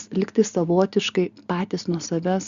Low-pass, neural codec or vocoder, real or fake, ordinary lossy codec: 7.2 kHz; none; real; Opus, 64 kbps